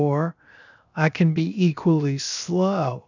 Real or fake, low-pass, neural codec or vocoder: fake; 7.2 kHz; codec, 16 kHz, 0.7 kbps, FocalCodec